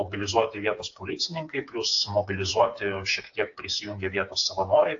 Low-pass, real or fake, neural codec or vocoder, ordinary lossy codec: 7.2 kHz; fake; codec, 16 kHz, 4 kbps, FreqCodec, smaller model; AAC, 48 kbps